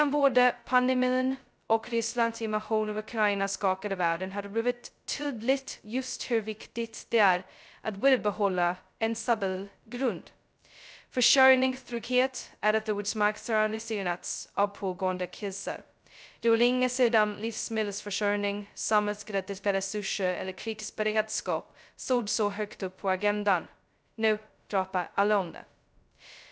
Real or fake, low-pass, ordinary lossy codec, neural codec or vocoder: fake; none; none; codec, 16 kHz, 0.2 kbps, FocalCodec